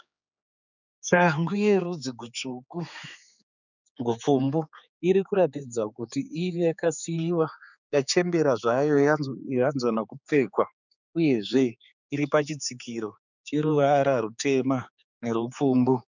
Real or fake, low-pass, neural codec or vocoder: fake; 7.2 kHz; codec, 16 kHz, 4 kbps, X-Codec, HuBERT features, trained on general audio